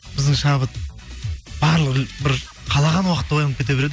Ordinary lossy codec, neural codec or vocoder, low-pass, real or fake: none; none; none; real